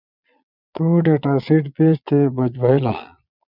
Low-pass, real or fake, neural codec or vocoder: 5.4 kHz; fake; vocoder, 22.05 kHz, 80 mel bands, Vocos